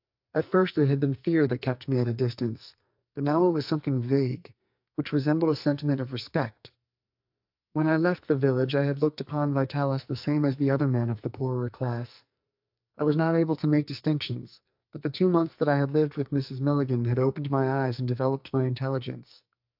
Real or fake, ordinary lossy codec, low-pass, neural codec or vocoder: fake; AAC, 48 kbps; 5.4 kHz; codec, 44.1 kHz, 2.6 kbps, SNAC